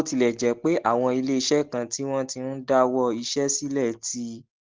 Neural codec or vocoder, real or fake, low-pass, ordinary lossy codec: none; real; 7.2 kHz; Opus, 16 kbps